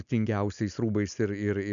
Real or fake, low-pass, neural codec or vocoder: real; 7.2 kHz; none